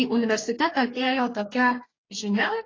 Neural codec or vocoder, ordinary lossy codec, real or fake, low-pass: codec, 44.1 kHz, 2.6 kbps, DAC; AAC, 32 kbps; fake; 7.2 kHz